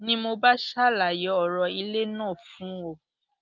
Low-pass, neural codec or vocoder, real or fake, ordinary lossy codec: 7.2 kHz; none; real; Opus, 24 kbps